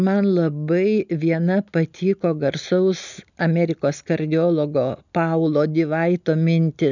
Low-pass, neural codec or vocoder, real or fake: 7.2 kHz; none; real